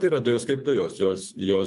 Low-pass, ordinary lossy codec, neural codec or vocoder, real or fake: 10.8 kHz; AAC, 96 kbps; codec, 24 kHz, 3 kbps, HILCodec; fake